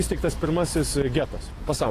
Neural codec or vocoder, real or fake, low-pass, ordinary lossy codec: none; real; 14.4 kHz; AAC, 48 kbps